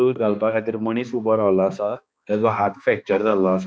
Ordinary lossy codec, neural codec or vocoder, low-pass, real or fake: none; codec, 16 kHz, 2 kbps, X-Codec, HuBERT features, trained on balanced general audio; none; fake